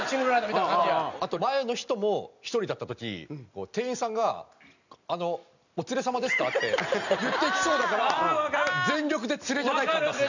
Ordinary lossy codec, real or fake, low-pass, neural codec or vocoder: none; real; 7.2 kHz; none